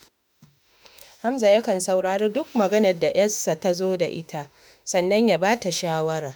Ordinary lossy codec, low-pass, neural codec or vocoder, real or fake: none; none; autoencoder, 48 kHz, 32 numbers a frame, DAC-VAE, trained on Japanese speech; fake